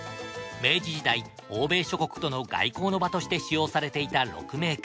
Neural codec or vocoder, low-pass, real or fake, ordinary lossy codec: none; none; real; none